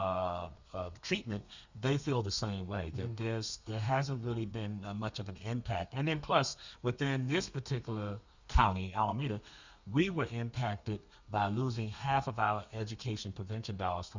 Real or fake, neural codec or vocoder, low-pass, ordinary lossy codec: fake; codec, 32 kHz, 1.9 kbps, SNAC; 7.2 kHz; Opus, 64 kbps